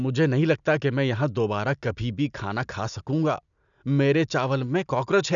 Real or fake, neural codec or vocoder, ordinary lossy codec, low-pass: real; none; none; 7.2 kHz